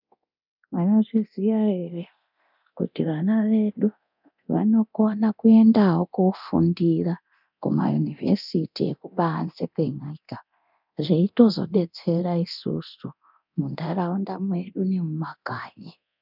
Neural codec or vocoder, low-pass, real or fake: codec, 24 kHz, 0.9 kbps, DualCodec; 5.4 kHz; fake